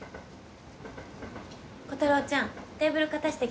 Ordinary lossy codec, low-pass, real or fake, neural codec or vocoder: none; none; real; none